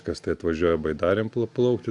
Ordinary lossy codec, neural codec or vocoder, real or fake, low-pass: AAC, 64 kbps; none; real; 10.8 kHz